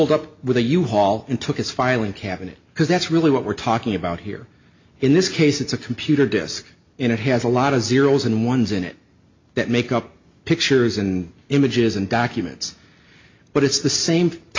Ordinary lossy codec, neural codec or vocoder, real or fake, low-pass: MP3, 48 kbps; none; real; 7.2 kHz